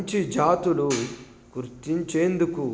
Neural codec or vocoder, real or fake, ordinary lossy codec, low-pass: none; real; none; none